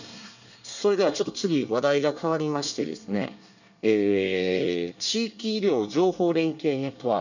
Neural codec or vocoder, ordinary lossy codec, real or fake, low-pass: codec, 24 kHz, 1 kbps, SNAC; none; fake; 7.2 kHz